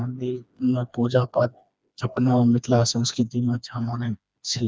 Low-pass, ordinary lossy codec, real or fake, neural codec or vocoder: none; none; fake; codec, 16 kHz, 2 kbps, FreqCodec, smaller model